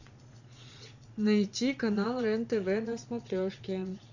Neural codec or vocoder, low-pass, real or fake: vocoder, 22.05 kHz, 80 mel bands, Vocos; 7.2 kHz; fake